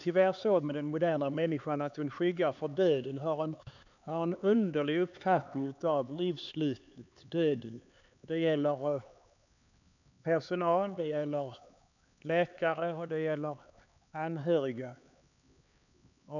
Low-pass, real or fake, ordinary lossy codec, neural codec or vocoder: 7.2 kHz; fake; none; codec, 16 kHz, 4 kbps, X-Codec, HuBERT features, trained on LibriSpeech